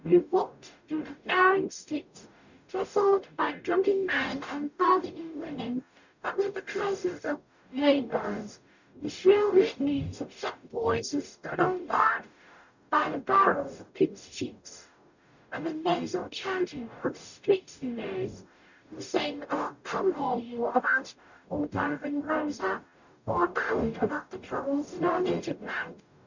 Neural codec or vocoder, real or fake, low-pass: codec, 44.1 kHz, 0.9 kbps, DAC; fake; 7.2 kHz